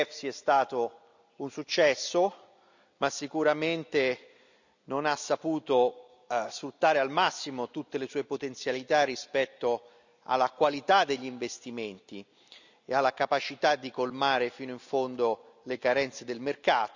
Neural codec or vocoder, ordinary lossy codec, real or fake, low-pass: none; none; real; 7.2 kHz